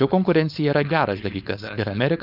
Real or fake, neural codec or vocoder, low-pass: fake; codec, 16 kHz, 4.8 kbps, FACodec; 5.4 kHz